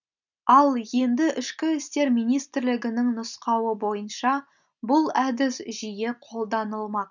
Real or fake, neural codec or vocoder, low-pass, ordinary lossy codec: real; none; 7.2 kHz; none